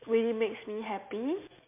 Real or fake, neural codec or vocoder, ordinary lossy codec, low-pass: real; none; MP3, 32 kbps; 3.6 kHz